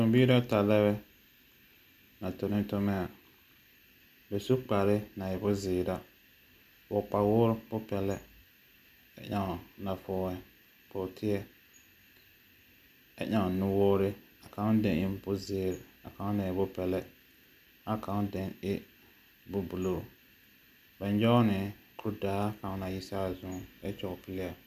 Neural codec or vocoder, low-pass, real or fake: none; 14.4 kHz; real